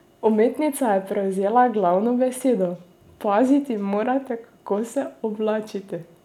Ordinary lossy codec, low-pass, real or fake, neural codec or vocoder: none; 19.8 kHz; real; none